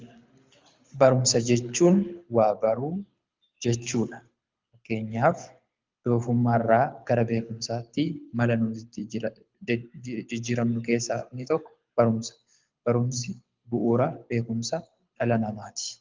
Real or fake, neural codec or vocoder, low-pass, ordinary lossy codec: fake; codec, 24 kHz, 6 kbps, HILCodec; 7.2 kHz; Opus, 32 kbps